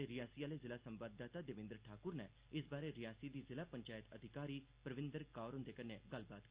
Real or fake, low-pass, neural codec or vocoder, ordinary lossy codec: real; 3.6 kHz; none; Opus, 24 kbps